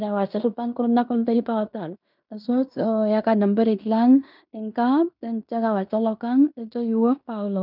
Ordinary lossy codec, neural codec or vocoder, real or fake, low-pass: none; codec, 16 kHz in and 24 kHz out, 0.9 kbps, LongCat-Audio-Codec, fine tuned four codebook decoder; fake; 5.4 kHz